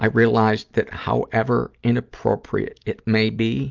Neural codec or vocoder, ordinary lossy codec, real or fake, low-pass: none; Opus, 32 kbps; real; 7.2 kHz